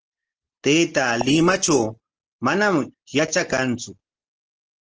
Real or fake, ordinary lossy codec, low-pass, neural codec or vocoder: real; Opus, 16 kbps; 7.2 kHz; none